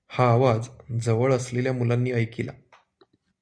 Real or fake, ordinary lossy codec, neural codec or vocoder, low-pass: real; Opus, 64 kbps; none; 9.9 kHz